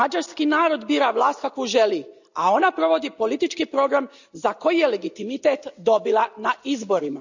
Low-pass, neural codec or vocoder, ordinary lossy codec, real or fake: 7.2 kHz; none; none; real